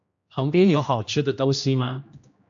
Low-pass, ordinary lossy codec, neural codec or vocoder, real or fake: 7.2 kHz; AAC, 64 kbps; codec, 16 kHz, 1 kbps, X-Codec, HuBERT features, trained on general audio; fake